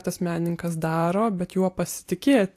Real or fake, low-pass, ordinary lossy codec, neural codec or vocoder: fake; 14.4 kHz; AAC, 64 kbps; vocoder, 44.1 kHz, 128 mel bands every 512 samples, BigVGAN v2